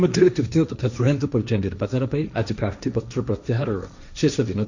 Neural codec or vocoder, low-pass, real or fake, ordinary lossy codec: codec, 16 kHz, 1.1 kbps, Voila-Tokenizer; 7.2 kHz; fake; none